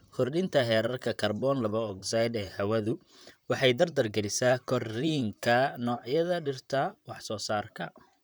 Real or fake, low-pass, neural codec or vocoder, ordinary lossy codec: fake; none; vocoder, 44.1 kHz, 128 mel bands, Pupu-Vocoder; none